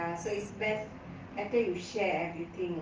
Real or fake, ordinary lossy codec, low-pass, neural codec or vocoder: real; Opus, 24 kbps; 7.2 kHz; none